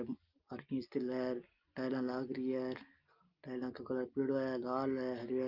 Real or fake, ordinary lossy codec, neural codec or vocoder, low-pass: real; Opus, 24 kbps; none; 5.4 kHz